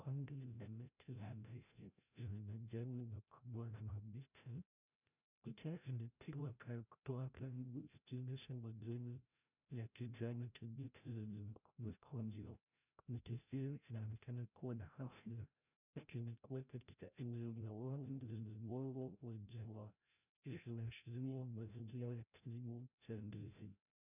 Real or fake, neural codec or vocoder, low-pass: fake; codec, 16 kHz, 0.5 kbps, FreqCodec, larger model; 3.6 kHz